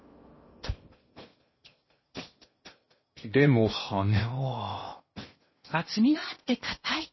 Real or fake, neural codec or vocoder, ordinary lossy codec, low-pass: fake; codec, 16 kHz in and 24 kHz out, 0.8 kbps, FocalCodec, streaming, 65536 codes; MP3, 24 kbps; 7.2 kHz